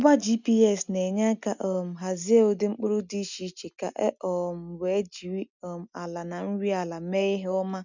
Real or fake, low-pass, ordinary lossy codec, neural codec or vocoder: real; 7.2 kHz; none; none